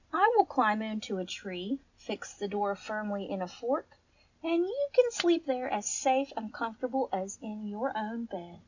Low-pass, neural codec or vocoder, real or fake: 7.2 kHz; none; real